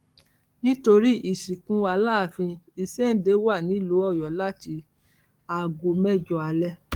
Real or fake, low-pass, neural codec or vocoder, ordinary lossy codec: fake; 19.8 kHz; codec, 44.1 kHz, 7.8 kbps, DAC; Opus, 32 kbps